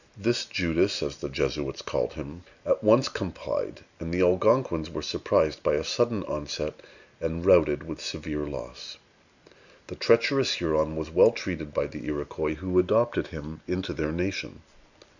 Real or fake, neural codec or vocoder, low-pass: real; none; 7.2 kHz